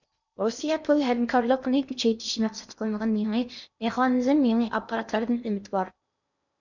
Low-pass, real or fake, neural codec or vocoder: 7.2 kHz; fake; codec, 16 kHz in and 24 kHz out, 0.8 kbps, FocalCodec, streaming, 65536 codes